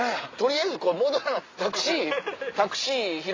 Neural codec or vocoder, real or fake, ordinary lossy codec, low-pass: none; real; AAC, 32 kbps; 7.2 kHz